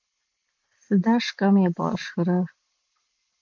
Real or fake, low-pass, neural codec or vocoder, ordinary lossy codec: real; 7.2 kHz; none; AAC, 48 kbps